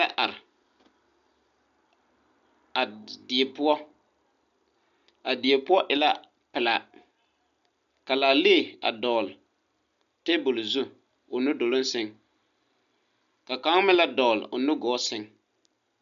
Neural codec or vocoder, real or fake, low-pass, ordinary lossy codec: none; real; 7.2 kHz; MP3, 96 kbps